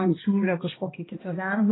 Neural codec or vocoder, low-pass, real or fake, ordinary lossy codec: codec, 16 kHz, 1 kbps, X-Codec, HuBERT features, trained on balanced general audio; 7.2 kHz; fake; AAC, 16 kbps